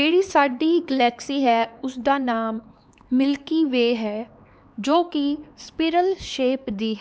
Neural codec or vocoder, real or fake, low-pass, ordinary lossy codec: codec, 16 kHz, 4 kbps, X-Codec, HuBERT features, trained on LibriSpeech; fake; none; none